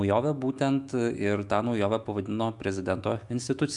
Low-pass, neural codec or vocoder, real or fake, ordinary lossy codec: 10.8 kHz; autoencoder, 48 kHz, 128 numbers a frame, DAC-VAE, trained on Japanese speech; fake; Opus, 64 kbps